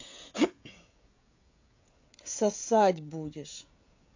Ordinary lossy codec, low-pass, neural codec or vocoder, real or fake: AAC, 48 kbps; 7.2 kHz; codec, 16 kHz, 16 kbps, FreqCodec, smaller model; fake